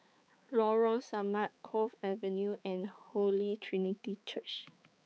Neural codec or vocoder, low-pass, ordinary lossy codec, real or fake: codec, 16 kHz, 4 kbps, X-Codec, HuBERT features, trained on balanced general audio; none; none; fake